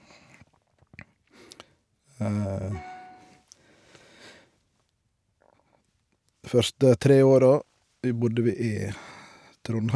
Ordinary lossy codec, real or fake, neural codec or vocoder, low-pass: none; real; none; none